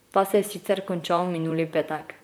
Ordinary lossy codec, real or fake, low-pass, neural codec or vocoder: none; fake; none; vocoder, 44.1 kHz, 128 mel bands, Pupu-Vocoder